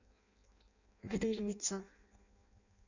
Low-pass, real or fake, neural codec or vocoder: 7.2 kHz; fake; codec, 16 kHz in and 24 kHz out, 0.6 kbps, FireRedTTS-2 codec